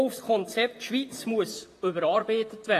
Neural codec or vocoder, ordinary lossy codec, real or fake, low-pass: vocoder, 44.1 kHz, 128 mel bands, Pupu-Vocoder; AAC, 48 kbps; fake; 14.4 kHz